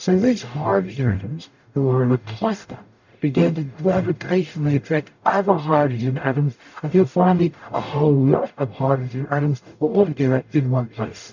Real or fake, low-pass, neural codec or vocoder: fake; 7.2 kHz; codec, 44.1 kHz, 0.9 kbps, DAC